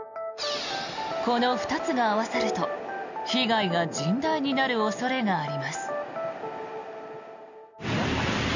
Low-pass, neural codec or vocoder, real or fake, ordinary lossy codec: 7.2 kHz; none; real; none